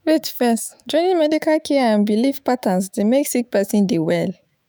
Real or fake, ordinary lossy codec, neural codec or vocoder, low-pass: fake; none; autoencoder, 48 kHz, 128 numbers a frame, DAC-VAE, trained on Japanese speech; none